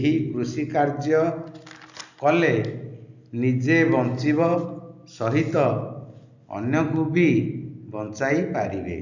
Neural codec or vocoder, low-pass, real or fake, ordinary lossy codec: none; 7.2 kHz; real; none